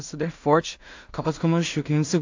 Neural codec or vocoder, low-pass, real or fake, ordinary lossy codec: codec, 16 kHz in and 24 kHz out, 0.4 kbps, LongCat-Audio-Codec, two codebook decoder; 7.2 kHz; fake; AAC, 48 kbps